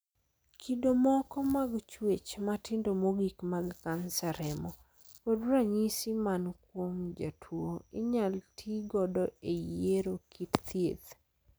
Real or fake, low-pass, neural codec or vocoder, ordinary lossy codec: real; none; none; none